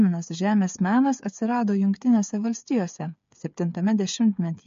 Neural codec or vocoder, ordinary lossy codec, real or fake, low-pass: codec, 16 kHz, 8 kbps, FreqCodec, smaller model; MP3, 48 kbps; fake; 7.2 kHz